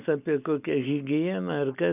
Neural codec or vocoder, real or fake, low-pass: none; real; 3.6 kHz